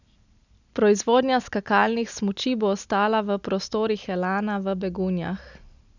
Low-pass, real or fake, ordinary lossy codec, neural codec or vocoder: 7.2 kHz; real; none; none